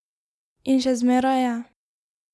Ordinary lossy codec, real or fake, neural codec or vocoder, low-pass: none; real; none; none